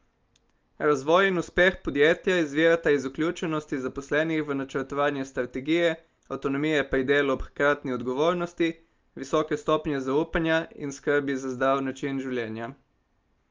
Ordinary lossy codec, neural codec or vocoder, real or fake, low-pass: Opus, 24 kbps; none; real; 7.2 kHz